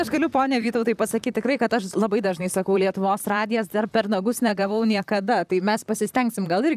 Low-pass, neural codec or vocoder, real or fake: 14.4 kHz; vocoder, 44.1 kHz, 128 mel bands, Pupu-Vocoder; fake